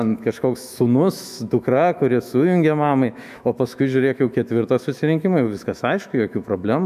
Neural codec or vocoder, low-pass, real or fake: autoencoder, 48 kHz, 128 numbers a frame, DAC-VAE, trained on Japanese speech; 14.4 kHz; fake